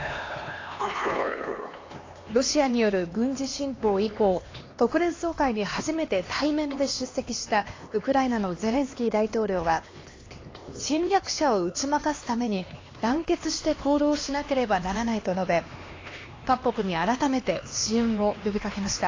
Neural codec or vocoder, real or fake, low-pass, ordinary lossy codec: codec, 16 kHz, 2 kbps, X-Codec, HuBERT features, trained on LibriSpeech; fake; 7.2 kHz; AAC, 32 kbps